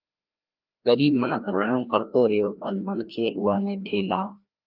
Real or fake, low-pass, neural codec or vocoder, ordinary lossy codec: fake; 5.4 kHz; codec, 16 kHz, 1 kbps, FreqCodec, larger model; Opus, 32 kbps